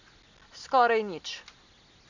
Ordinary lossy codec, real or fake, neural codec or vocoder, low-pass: none; real; none; 7.2 kHz